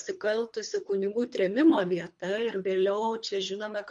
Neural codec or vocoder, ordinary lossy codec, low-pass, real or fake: codec, 24 kHz, 3 kbps, HILCodec; MP3, 48 kbps; 10.8 kHz; fake